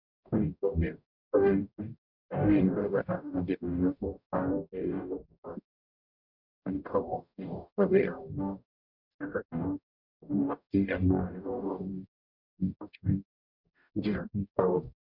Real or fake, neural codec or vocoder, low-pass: fake; codec, 44.1 kHz, 0.9 kbps, DAC; 5.4 kHz